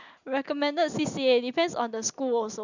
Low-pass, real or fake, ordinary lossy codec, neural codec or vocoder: 7.2 kHz; real; none; none